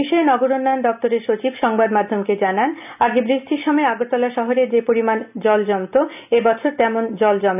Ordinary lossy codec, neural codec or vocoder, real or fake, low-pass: none; none; real; 3.6 kHz